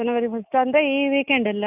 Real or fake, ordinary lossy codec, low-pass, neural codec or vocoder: real; none; 3.6 kHz; none